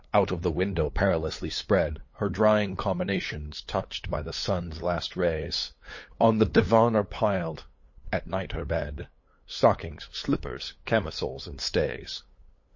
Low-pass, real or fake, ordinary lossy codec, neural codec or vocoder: 7.2 kHz; fake; MP3, 32 kbps; codec, 16 kHz, 8 kbps, FunCodec, trained on LibriTTS, 25 frames a second